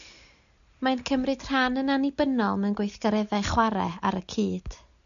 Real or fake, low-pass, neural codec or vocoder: real; 7.2 kHz; none